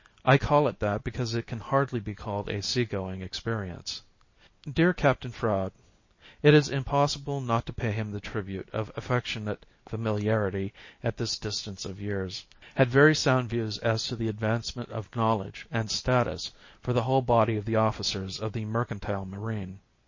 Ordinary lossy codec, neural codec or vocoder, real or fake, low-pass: MP3, 32 kbps; none; real; 7.2 kHz